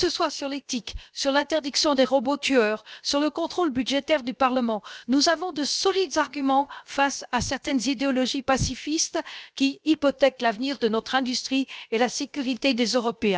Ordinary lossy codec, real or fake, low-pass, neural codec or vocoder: none; fake; none; codec, 16 kHz, 0.7 kbps, FocalCodec